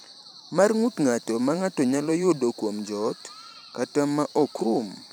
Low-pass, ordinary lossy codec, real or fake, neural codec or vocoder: none; none; fake; vocoder, 44.1 kHz, 128 mel bands every 512 samples, BigVGAN v2